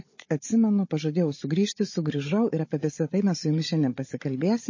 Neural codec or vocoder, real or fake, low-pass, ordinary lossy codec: codec, 16 kHz, 16 kbps, FunCodec, trained on Chinese and English, 50 frames a second; fake; 7.2 kHz; MP3, 32 kbps